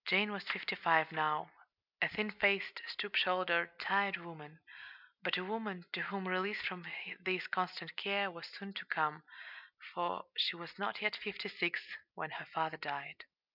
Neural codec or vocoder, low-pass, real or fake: none; 5.4 kHz; real